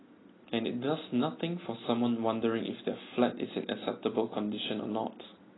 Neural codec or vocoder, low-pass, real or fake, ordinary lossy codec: none; 7.2 kHz; real; AAC, 16 kbps